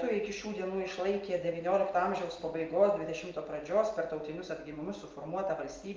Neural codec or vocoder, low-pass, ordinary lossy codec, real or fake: none; 7.2 kHz; Opus, 32 kbps; real